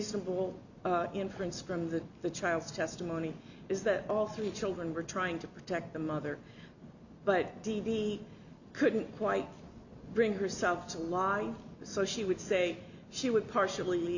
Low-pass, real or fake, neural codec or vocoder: 7.2 kHz; real; none